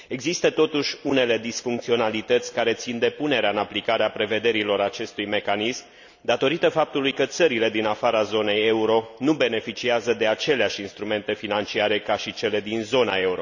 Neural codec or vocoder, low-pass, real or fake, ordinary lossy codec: none; 7.2 kHz; real; none